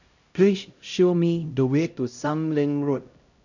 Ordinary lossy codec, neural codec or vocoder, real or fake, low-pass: none; codec, 16 kHz, 0.5 kbps, X-Codec, HuBERT features, trained on LibriSpeech; fake; 7.2 kHz